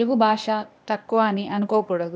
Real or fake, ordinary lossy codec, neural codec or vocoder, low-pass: fake; none; codec, 16 kHz, 0.8 kbps, ZipCodec; none